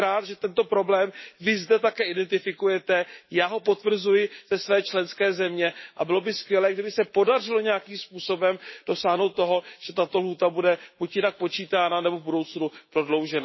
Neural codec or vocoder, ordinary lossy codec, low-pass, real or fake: autoencoder, 48 kHz, 128 numbers a frame, DAC-VAE, trained on Japanese speech; MP3, 24 kbps; 7.2 kHz; fake